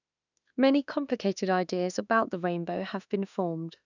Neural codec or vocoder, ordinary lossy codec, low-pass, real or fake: autoencoder, 48 kHz, 32 numbers a frame, DAC-VAE, trained on Japanese speech; none; 7.2 kHz; fake